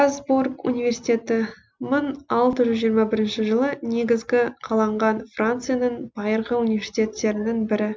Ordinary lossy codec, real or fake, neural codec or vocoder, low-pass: none; real; none; none